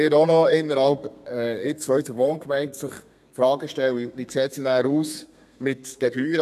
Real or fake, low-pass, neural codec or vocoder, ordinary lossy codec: fake; 14.4 kHz; codec, 32 kHz, 1.9 kbps, SNAC; none